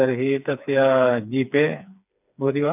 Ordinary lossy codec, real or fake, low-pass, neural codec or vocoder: none; fake; 3.6 kHz; codec, 16 kHz, 4 kbps, FreqCodec, smaller model